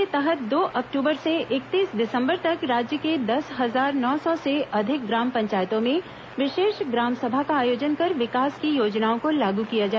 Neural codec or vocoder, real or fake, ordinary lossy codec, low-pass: none; real; none; none